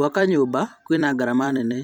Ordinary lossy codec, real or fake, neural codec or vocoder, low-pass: none; fake; vocoder, 44.1 kHz, 128 mel bands every 256 samples, BigVGAN v2; 19.8 kHz